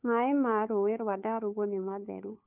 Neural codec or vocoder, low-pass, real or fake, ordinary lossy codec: codec, 16 kHz, 2 kbps, FunCodec, trained on Chinese and English, 25 frames a second; 3.6 kHz; fake; none